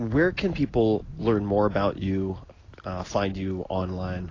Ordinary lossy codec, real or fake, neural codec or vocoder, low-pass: AAC, 32 kbps; real; none; 7.2 kHz